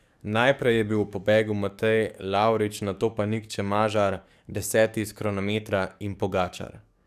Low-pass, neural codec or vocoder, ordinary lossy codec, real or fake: 14.4 kHz; codec, 44.1 kHz, 7.8 kbps, DAC; none; fake